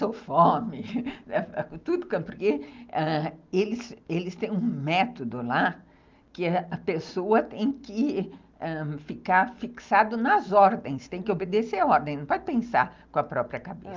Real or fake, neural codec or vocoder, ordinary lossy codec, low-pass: real; none; Opus, 32 kbps; 7.2 kHz